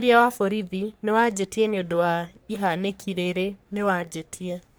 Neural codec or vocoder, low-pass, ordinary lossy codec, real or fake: codec, 44.1 kHz, 3.4 kbps, Pupu-Codec; none; none; fake